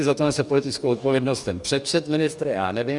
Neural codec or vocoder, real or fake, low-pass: codec, 44.1 kHz, 2.6 kbps, DAC; fake; 10.8 kHz